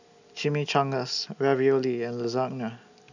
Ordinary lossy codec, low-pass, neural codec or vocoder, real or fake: none; 7.2 kHz; none; real